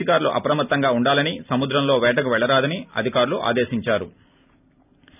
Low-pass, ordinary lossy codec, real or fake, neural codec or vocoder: 3.6 kHz; none; real; none